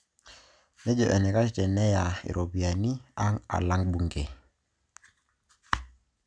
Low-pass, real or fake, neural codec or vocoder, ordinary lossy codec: 9.9 kHz; real; none; none